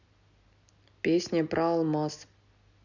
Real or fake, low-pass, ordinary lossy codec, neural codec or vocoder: real; 7.2 kHz; none; none